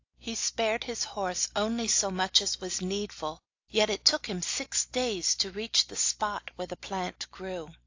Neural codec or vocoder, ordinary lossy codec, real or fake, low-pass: none; AAC, 48 kbps; real; 7.2 kHz